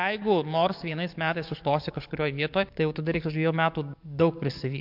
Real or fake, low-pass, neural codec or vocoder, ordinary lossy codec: fake; 5.4 kHz; codec, 44.1 kHz, 7.8 kbps, DAC; MP3, 48 kbps